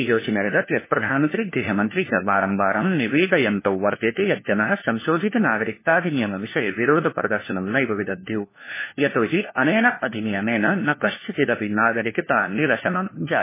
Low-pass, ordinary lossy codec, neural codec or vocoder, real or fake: 3.6 kHz; MP3, 16 kbps; codec, 16 kHz, 1 kbps, FunCodec, trained on LibriTTS, 50 frames a second; fake